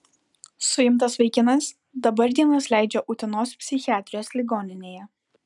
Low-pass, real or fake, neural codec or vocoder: 10.8 kHz; real; none